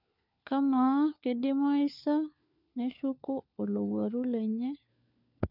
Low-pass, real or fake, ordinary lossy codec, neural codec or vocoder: 5.4 kHz; fake; none; codec, 16 kHz, 4 kbps, FunCodec, trained on LibriTTS, 50 frames a second